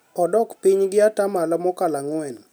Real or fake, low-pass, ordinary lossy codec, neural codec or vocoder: real; none; none; none